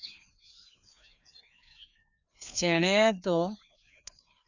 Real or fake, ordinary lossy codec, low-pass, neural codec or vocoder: fake; none; 7.2 kHz; codec, 16 kHz, 2 kbps, FreqCodec, larger model